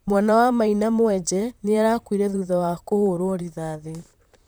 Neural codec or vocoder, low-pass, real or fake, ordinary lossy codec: vocoder, 44.1 kHz, 128 mel bands, Pupu-Vocoder; none; fake; none